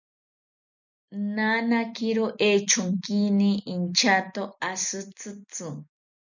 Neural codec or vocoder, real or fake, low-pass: none; real; 7.2 kHz